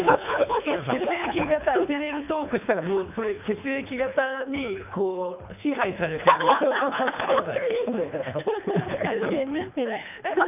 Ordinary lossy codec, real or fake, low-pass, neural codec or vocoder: none; fake; 3.6 kHz; codec, 24 kHz, 3 kbps, HILCodec